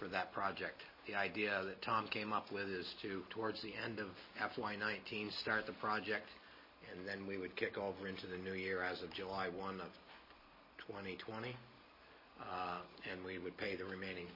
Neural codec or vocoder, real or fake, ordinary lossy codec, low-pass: none; real; MP3, 24 kbps; 5.4 kHz